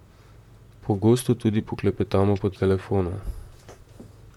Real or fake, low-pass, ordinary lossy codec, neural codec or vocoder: fake; 19.8 kHz; MP3, 96 kbps; vocoder, 44.1 kHz, 128 mel bands, Pupu-Vocoder